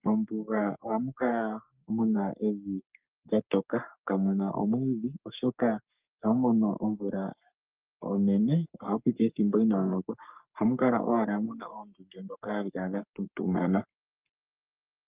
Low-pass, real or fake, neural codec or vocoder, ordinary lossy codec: 3.6 kHz; fake; codec, 44.1 kHz, 3.4 kbps, Pupu-Codec; Opus, 24 kbps